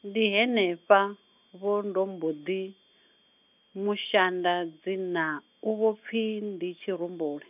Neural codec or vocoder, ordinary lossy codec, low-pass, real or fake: none; none; 3.6 kHz; real